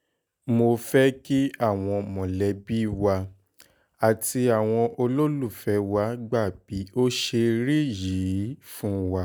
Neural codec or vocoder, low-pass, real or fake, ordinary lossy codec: none; none; real; none